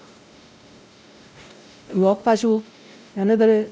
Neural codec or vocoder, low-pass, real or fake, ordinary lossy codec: codec, 16 kHz, 0.5 kbps, X-Codec, WavLM features, trained on Multilingual LibriSpeech; none; fake; none